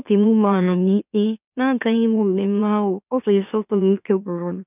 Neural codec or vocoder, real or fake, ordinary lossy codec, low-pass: autoencoder, 44.1 kHz, a latent of 192 numbers a frame, MeloTTS; fake; none; 3.6 kHz